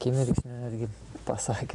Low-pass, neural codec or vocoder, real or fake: 10.8 kHz; none; real